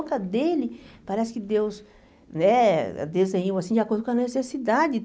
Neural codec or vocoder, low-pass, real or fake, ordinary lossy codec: none; none; real; none